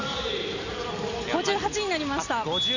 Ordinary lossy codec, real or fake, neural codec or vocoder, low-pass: none; real; none; 7.2 kHz